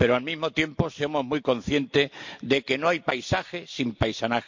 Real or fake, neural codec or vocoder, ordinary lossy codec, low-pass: real; none; MP3, 48 kbps; 7.2 kHz